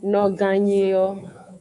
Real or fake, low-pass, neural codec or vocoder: fake; 10.8 kHz; codec, 24 kHz, 3.1 kbps, DualCodec